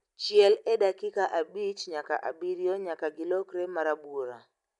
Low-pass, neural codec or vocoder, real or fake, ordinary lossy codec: 10.8 kHz; none; real; none